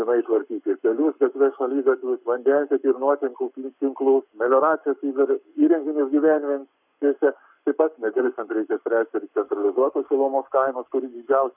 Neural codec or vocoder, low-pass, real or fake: codec, 44.1 kHz, 7.8 kbps, Pupu-Codec; 3.6 kHz; fake